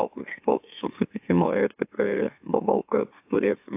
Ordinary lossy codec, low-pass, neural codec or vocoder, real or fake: AAC, 32 kbps; 3.6 kHz; autoencoder, 44.1 kHz, a latent of 192 numbers a frame, MeloTTS; fake